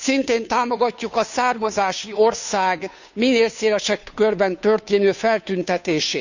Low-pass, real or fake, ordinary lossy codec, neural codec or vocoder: 7.2 kHz; fake; none; codec, 16 kHz, 2 kbps, FunCodec, trained on Chinese and English, 25 frames a second